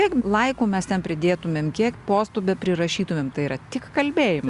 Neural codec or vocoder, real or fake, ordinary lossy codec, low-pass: none; real; Opus, 64 kbps; 10.8 kHz